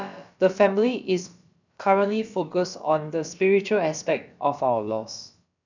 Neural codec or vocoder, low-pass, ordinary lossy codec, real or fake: codec, 16 kHz, about 1 kbps, DyCAST, with the encoder's durations; 7.2 kHz; none; fake